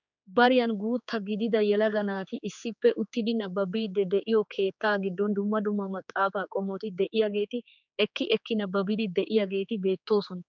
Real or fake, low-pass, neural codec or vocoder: fake; 7.2 kHz; codec, 16 kHz, 4 kbps, X-Codec, HuBERT features, trained on general audio